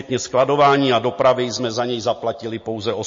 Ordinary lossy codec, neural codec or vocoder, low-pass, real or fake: MP3, 32 kbps; none; 7.2 kHz; real